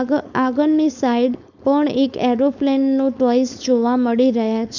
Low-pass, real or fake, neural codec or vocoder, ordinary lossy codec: 7.2 kHz; fake; codec, 16 kHz, 4.8 kbps, FACodec; none